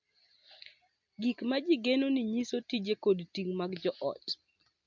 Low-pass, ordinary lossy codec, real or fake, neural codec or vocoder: 7.2 kHz; MP3, 64 kbps; real; none